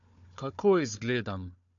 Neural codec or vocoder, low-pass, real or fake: codec, 16 kHz, 4 kbps, FunCodec, trained on Chinese and English, 50 frames a second; 7.2 kHz; fake